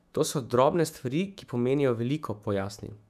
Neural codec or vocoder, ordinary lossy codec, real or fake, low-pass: autoencoder, 48 kHz, 128 numbers a frame, DAC-VAE, trained on Japanese speech; none; fake; 14.4 kHz